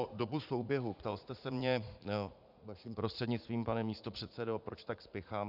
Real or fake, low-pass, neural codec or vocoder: fake; 5.4 kHz; vocoder, 44.1 kHz, 80 mel bands, Vocos